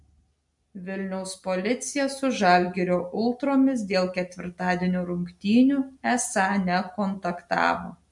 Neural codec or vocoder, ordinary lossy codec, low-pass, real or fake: none; MP3, 64 kbps; 10.8 kHz; real